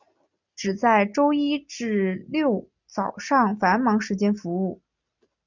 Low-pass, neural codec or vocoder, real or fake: 7.2 kHz; none; real